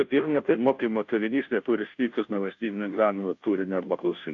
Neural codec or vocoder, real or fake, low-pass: codec, 16 kHz, 0.5 kbps, FunCodec, trained on Chinese and English, 25 frames a second; fake; 7.2 kHz